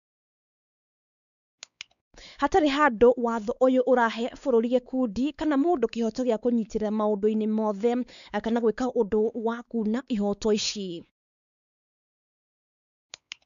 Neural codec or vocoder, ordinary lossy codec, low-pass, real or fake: codec, 16 kHz, 4 kbps, X-Codec, WavLM features, trained on Multilingual LibriSpeech; none; 7.2 kHz; fake